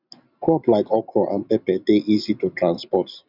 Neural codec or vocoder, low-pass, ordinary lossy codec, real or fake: none; 5.4 kHz; none; real